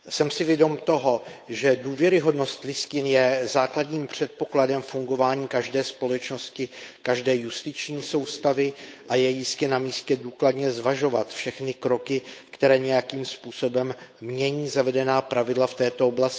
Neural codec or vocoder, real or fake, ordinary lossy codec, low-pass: codec, 16 kHz, 8 kbps, FunCodec, trained on Chinese and English, 25 frames a second; fake; none; none